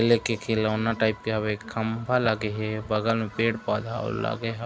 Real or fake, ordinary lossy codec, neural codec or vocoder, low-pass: real; none; none; none